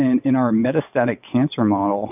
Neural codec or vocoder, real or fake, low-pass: vocoder, 22.05 kHz, 80 mel bands, WaveNeXt; fake; 3.6 kHz